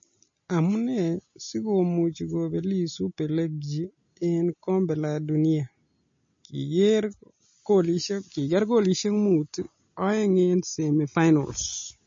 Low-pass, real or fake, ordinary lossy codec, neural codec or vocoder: 7.2 kHz; real; MP3, 32 kbps; none